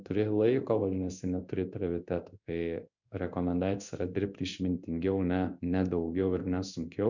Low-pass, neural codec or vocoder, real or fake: 7.2 kHz; codec, 16 kHz in and 24 kHz out, 1 kbps, XY-Tokenizer; fake